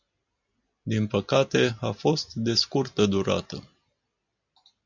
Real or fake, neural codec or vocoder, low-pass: real; none; 7.2 kHz